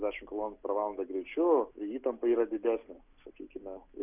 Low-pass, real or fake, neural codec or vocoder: 3.6 kHz; real; none